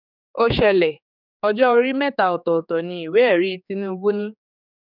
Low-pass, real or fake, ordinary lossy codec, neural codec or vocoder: 5.4 kHz; fake; none; codec, 16 kHz, 4 kbps, X-Codec, HuBERT features, trained on general audio